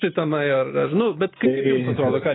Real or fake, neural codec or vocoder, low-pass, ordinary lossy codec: real; none; 7.2 kHz; AAC, 16 kbps